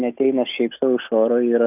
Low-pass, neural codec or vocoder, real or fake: 3.6 kHz; none; real